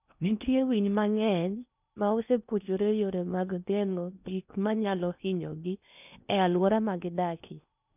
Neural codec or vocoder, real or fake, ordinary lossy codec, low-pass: codec, 16 kHz in and 24 kHz out, 0.8 kbps, FocalCodec, streaming, 65536 codes; fake; none; 3.6 kHz